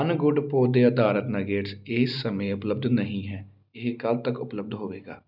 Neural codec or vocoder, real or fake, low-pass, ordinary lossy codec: none; real; 5.4 kHz; none